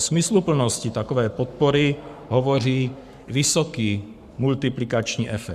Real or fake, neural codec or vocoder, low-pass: fake; codec, 44.1 kHz, 7.8 kbps, Pupu-Codec; 14.4 kHz